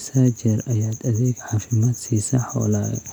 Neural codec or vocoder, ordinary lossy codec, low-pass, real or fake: none; none; 19.8 kHz; real